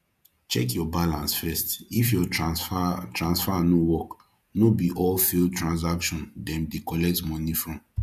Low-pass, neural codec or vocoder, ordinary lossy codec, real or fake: 14.4 kHz; vocoder, 48 kHz, 128 mel bands, Vocos; none; fake